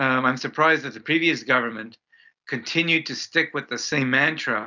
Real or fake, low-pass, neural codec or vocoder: real; 7.2 kHz; none